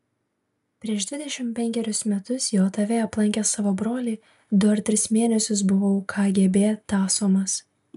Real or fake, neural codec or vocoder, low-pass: real; none; 14.4 kHz